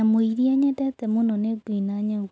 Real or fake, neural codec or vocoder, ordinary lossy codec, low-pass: real; none; none; none